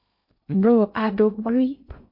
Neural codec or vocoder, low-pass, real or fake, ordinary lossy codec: codec, 16 kHz in and 24 kHz out, 0.6 kbps, FocalCodec, streaming, 2048 codes; 5.4 kHz; fake; MP3, 32 kbps